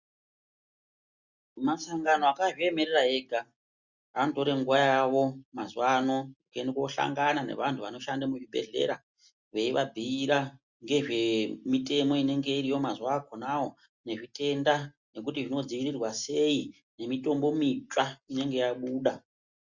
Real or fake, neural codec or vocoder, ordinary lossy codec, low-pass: real; none; Opus, 64 kbps; 7.2 kHz